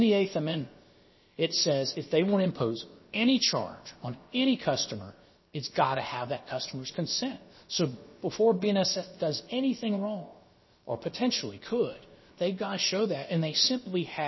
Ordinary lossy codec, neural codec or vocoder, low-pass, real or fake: MP3, 24 kbps; codec, 16 kHz, about 1 kbps, DyCAST, with the encoder's durations; 7.2 kHz; fake